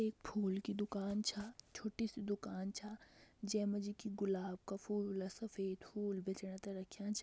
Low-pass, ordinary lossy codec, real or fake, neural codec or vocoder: none; none; real; none